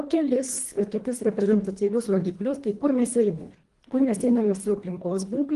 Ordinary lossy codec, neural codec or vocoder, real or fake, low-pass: Opus, 24 kbps; codec, 24 kHz, 1.5 kbps, HILCodec; fake; 10.8 kHz